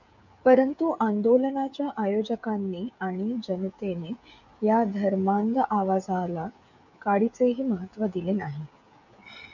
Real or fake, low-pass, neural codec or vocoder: fake; 7.2 kHz; codec, 16 kHz, 16 kbps, FreqCodec, smaller model